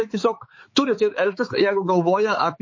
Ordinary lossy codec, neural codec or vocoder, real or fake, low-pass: MP3, 32 kbps; codec, 16 kHz, 4 kbps, X-Codec, HuBERT features, trained on balanced general audio; fake; 7.2 kHz